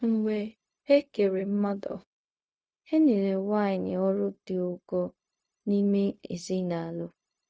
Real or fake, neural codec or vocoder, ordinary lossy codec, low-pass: fake; codec, 16 kHz, 0.4 kbps, LongCat-Audio-Codec; none; none